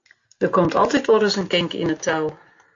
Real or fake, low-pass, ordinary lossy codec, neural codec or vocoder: real; 7.2 kHz; AAC, 48 kbps; none